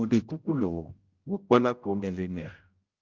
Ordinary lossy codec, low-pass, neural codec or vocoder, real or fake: Opus, 32 kbps; 7.2 kHz; codec, 16 kHz, 0.5 kbps, X-Codec, HuBERT features, trained on general audio; fake